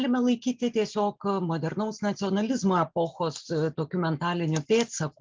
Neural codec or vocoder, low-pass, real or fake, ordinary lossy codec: none; 7.2 kHz; real; Opus, 32 kbps